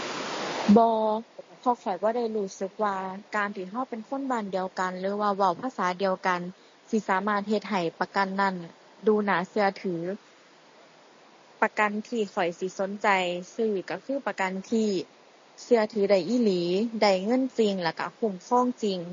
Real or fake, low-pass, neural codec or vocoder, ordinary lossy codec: real; 7.2 kHz; none; MP3, 32 kbps